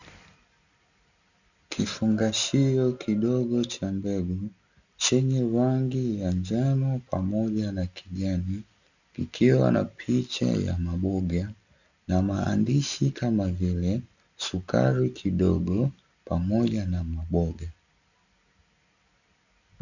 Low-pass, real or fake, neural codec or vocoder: 7.2 kHz; real; none